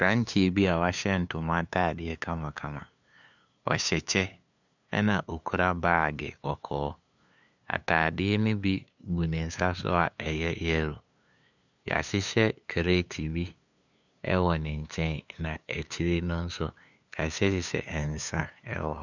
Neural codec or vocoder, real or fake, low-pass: codec, 16 kHz, 2 kbps, FunCodec, trained on LibriTTS, 25 frames a second; fake; 7.2 kHz